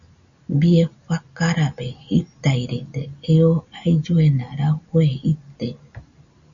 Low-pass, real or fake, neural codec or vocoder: 7.2 kHz; real; none